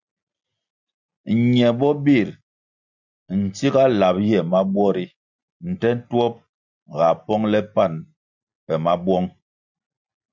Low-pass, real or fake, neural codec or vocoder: 7.2 kHz; real; none